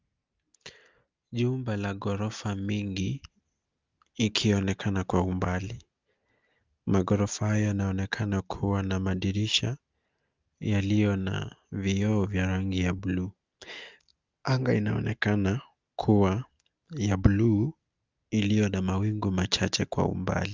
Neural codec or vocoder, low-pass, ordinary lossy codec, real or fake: none; 7.2 kHz; Opus, 24 kbps; real